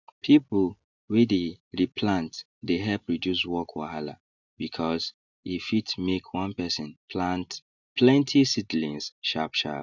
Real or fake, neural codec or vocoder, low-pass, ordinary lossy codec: real; none; 7.2 kHz; none